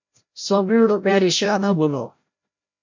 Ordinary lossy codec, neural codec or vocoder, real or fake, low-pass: MP3, 48 kbps; codec, 16 kHz, 0.5 kbps, FreqCodec, larger model; fake; 7.2 kHz